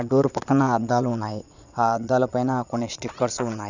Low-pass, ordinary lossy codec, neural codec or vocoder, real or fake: 7.2 kHz; none; vocoder, 22.05 kHz, 80 mel bands, Vocos; fake